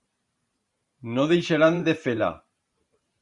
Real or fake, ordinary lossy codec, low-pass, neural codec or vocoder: fake; Opus, 64 kbps; 10.8 kHz; vocoder, 44.1 kHz, 128 mel bands every 512 samples, BigVGAN v2